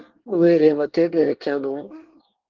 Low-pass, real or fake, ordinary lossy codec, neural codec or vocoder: 7.2 kHz; fake; Opus, 16 kbps; codec, 24 kHz, 1 kbps, SNAC